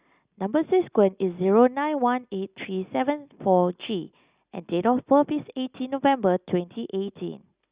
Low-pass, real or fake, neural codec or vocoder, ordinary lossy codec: 3.6 kHz; real; none; Opus, 64 kbps